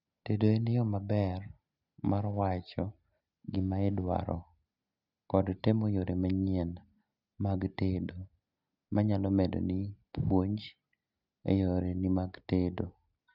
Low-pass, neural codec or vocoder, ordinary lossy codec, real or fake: 5.4 kHz; none; none; real